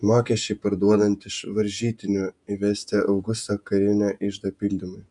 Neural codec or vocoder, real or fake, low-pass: vocoder, 48 kHz, 128 mel bands, Vocos; fake; 10.8 kHz